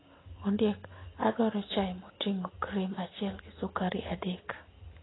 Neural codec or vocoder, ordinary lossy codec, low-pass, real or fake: none; AAC, 16 kbps; 7.2 kHz; real